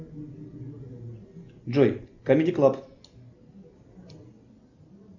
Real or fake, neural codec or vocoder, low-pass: real; none; 7.2 kHz